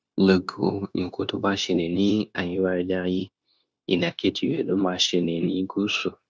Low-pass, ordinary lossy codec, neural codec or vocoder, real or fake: none; none; codec, 16 kHz, 0.9 kbps, LongCat-Audio-Codec; fake